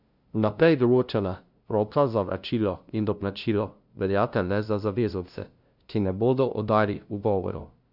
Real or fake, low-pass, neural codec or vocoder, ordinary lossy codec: fake; 5.4 kHz; codec, 16 kHz, 0.5 kbps, FunCodec, trained on LibriTTS, 25 frames a second; none